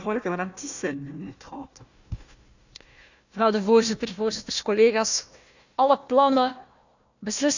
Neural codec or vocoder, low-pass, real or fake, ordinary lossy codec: codec, 16 kHz, 1 kbps, FunCodec, trained on Chinese and English, 50 frames a second; 7.2 kHz; fake; none